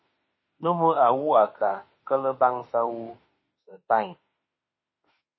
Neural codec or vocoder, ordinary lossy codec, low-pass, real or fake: autoencoder, 48 kHz, 32 numbers a frame, DAC-VAE, trained on Japanese speech; MP3, 24 kbps; 7.2 kHz; fake